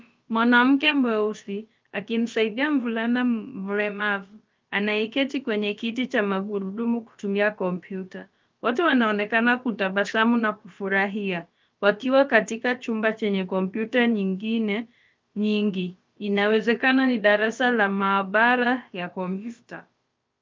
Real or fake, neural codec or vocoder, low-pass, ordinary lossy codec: fake; codec, 16 kHz, about 1 kbps, DyCAST, with the encoder's durations; 7.2 kHz; Opus, 24 kbps